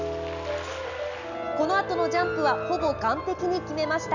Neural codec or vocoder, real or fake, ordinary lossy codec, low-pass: none; real; none; 7.2 kHz